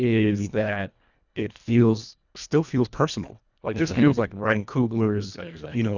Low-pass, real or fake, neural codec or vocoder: 7.2 kHz; fake; codec, 24 kHz, 1.5 kbps, HILCodec